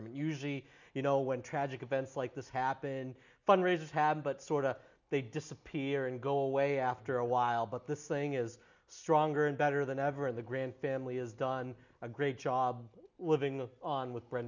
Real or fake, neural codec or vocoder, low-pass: real; none; 7.2 kHz